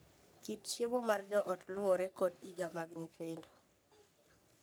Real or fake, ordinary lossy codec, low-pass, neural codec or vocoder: fake; none; none; codec, 44.1 kHz, 3.4 kbps, Pupu-Codec